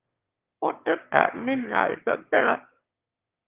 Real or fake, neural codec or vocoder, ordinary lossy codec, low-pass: fake; autoencoder, 22.05 kHz, a latent of 192 numbers a frame, VITS, trained on one speaker; Opus, 24 kbps; 3.6 kHz